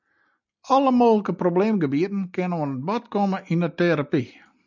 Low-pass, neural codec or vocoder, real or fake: 7.2 kHz; none; real